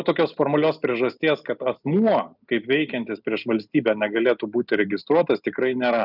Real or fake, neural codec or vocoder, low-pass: real; none; 5.4 kHz